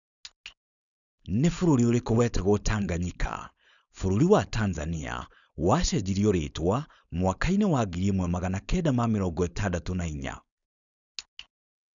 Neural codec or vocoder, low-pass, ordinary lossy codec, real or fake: codec, 16 kHz, 4.8 kbps, FACodec; 7.2 kHz; none; fake